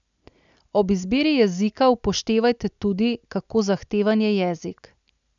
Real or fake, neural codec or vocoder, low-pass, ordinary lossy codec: real; none; 7.2 kHz; none